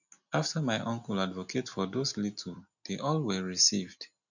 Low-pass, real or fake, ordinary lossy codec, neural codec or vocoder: 7.2 kHz; real; none; none